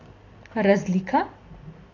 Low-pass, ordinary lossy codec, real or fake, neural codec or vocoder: 7.2 kHz; AAC, 32 kbps; real; none